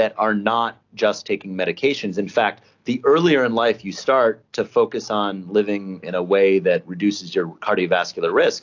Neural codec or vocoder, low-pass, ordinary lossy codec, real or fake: none; 7.2 kHz; AAC, 48 kbps; real